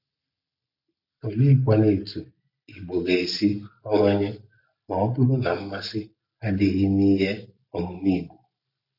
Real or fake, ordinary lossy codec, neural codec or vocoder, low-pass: real; none; none; 5.4 kHz